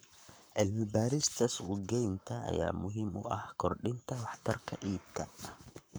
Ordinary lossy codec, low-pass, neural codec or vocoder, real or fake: none; none; codec, 44.1 kHz, 7.8 kbps, Pupu-Codec; fake